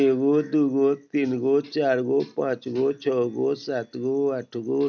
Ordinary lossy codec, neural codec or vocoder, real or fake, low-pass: none; none; real; 7.2 kHz